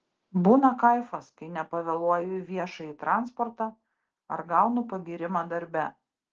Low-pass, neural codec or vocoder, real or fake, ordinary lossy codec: 7.2 kHz; none; real; Opus, 16 kbps